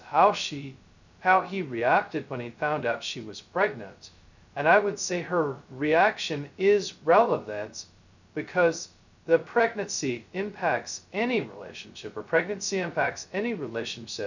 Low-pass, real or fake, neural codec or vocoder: 7.2 kHz; fake; codec, 16 kHz, 0.2 kbps, FocalCodec